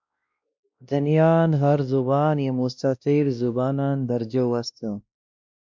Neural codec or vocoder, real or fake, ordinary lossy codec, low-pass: codec, 16 kHz, 1 kbps, X-Codec, WavLM features, trained on Multilingual LibriSpeech; fake; MP3, 64 kbps; 7.2 kHz